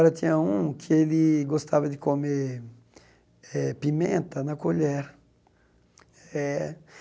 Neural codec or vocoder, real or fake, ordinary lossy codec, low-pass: none; real; none; none